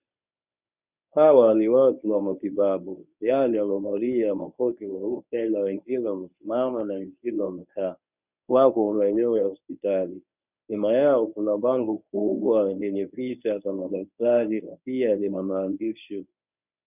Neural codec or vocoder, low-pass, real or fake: codec, 24 kHz, 0.9 kbps, WavTokenizer, medium speech release version 1; 3.6 kHz; fake